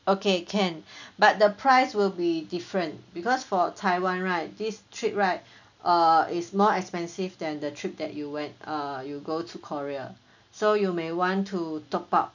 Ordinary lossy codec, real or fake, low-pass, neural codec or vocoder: none; real; 7.2 kHz; none